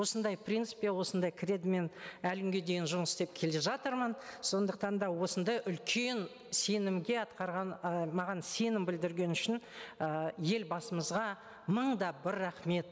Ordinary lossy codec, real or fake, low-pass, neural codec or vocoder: none; real; none; none